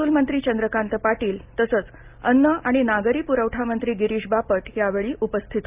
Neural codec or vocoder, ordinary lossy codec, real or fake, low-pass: none; Opus, 24 kbps; real; 3.6 kHz